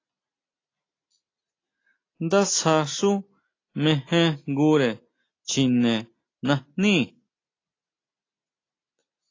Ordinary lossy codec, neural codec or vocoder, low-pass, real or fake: AAC, 32 kbps; none; 7.2 kHz; real